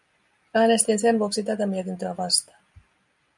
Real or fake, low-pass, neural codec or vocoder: real; 10.8 kHz; none